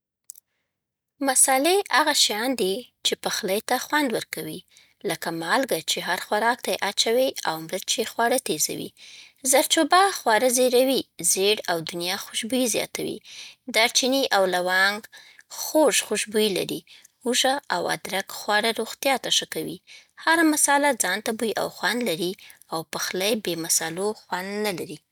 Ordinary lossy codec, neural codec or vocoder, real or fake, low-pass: none; none; real; none